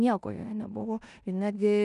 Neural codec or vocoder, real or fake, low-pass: codec, 16 kHz in and 24 kHz out, 0.9 kbps, LongCat-Audio-Codec, four codebook decoder; fake; 10.8 kHz